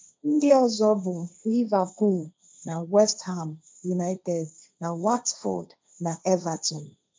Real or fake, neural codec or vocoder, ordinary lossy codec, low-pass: fake; codec, 16 kHz, 1.1 kbps, Voila-Tokenizer; none; none